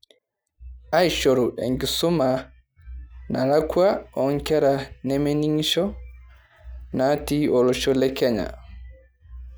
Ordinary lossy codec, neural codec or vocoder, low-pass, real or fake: none; none; none; real